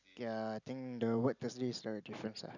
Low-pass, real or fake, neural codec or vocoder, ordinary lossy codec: 7.2 kHz; real; none; none